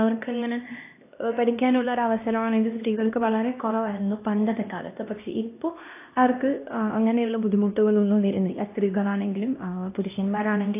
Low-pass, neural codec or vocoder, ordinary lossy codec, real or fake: 3.6 kHz; codec, 16 kHz, 1 kbps, X-Codec, HuBERT features, trained on LibriSpeech; AAC, 24 kbps; fake